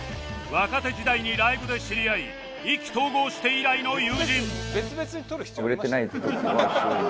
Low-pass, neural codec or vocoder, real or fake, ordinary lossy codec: none; none; real; none